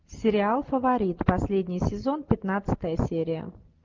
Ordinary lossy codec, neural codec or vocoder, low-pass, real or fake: Opus, 24 kbps; none; 7.2 kHz; real